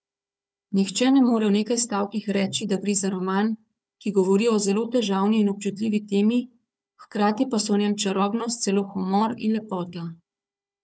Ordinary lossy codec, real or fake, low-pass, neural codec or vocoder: none; fake; none; codec, 16 kHz, 4 kbps, FunCodec, trained on Chinese and English, 50 frames a second